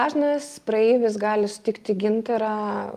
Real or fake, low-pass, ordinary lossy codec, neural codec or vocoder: real; 14.4 kHz; Opus, 32 kbps; none